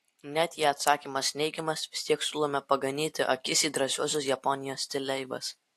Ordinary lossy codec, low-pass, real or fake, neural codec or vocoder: AAC, 64 kbps; 14.4 kHz; real; none